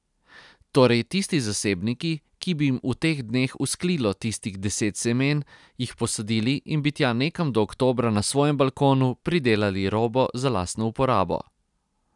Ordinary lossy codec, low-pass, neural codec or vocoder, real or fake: none; 10.8 kHz; none; real